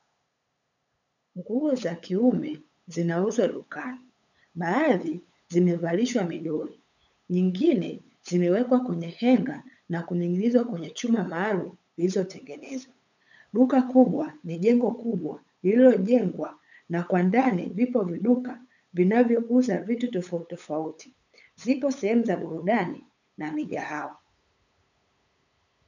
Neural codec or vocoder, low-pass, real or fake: codec, 16 kHz, 8 kbps, FunCodec, trained on LibriTTS, 25 frames a second; 7.2 kHz; fake